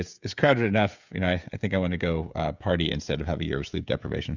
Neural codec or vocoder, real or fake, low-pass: codec, 16 kHz, 16 kbps, FreqCodec, smaller model; fake; 7.2 kHz